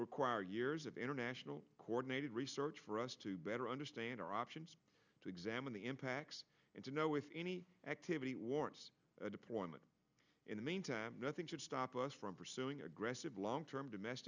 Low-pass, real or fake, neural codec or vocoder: 7.2 kHz; real; none